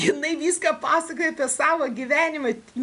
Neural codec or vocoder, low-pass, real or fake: none; 10.8 kHz; real